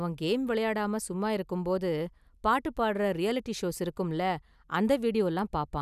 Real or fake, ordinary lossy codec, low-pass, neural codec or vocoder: real; none; 14.4 kHz; none